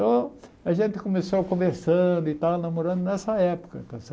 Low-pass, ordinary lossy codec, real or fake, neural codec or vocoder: none; none; real; none